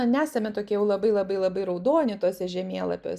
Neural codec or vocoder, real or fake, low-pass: none; real; 14.4 kHz